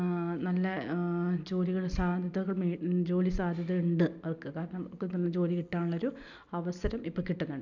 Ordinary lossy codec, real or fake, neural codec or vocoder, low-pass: none; real; none; 7.2 kHz